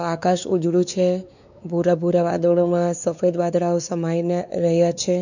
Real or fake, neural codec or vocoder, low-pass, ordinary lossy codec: fake; codec, 16 kHz, 4 kbps, X-Codec, WavLM features, trained on Multilingual LibriSpeech; 7.2 kHz; none